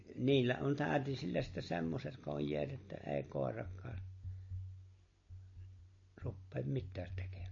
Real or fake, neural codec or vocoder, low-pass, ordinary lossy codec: real; none; 7.2 kHz; MP3, 32 kbps